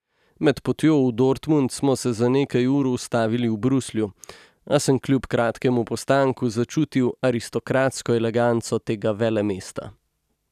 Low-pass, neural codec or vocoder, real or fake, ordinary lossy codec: 14.4 kHz; none; real; none